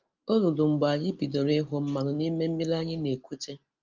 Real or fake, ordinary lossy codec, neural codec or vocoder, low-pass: real; Opus, 24 kbps; none; 7.2 kHz